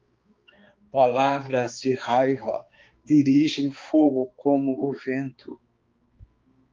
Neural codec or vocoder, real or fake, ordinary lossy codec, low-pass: codec, 16 kHz, 2 kbps, X-Codec, HuBERT features, trained on balanced general audio; fake; Opus, 24 kbps; 7.2 kHz